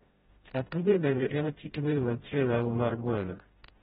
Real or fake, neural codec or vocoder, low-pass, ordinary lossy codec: fake; codec, 16 kHz, 0.5 kbps, FreqCodec, smaller model; 7.2 kHz; AAC, 16 kbps